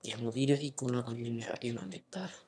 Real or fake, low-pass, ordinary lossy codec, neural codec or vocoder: fake; none; none; autoencoder, 22.05 kHz, a latent of 192 numbers a frame, VITS, trained on one speaker